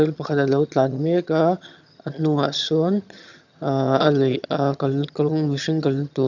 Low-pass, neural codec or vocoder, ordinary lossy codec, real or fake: 7.2 kHz; vocoder, 22.05 kHz, 80 mel bands, HiFi-GAN; none; fake